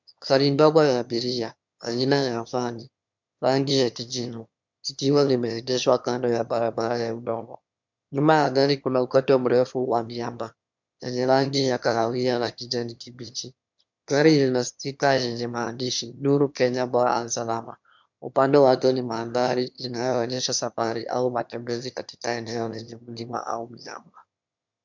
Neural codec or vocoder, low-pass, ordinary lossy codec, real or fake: autoencoder, 22.05 kHz, a latent of 192 numbers a frame, VITS, trained on one speaker; 7.2 kHz; MP3, 64 kbps; fake